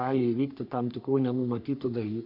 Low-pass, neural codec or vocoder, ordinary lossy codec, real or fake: 5.4 kHz; codec, 44.1 kHz, 3.4 kbps, Pupu-Codec; MP3, 48 kbps; fake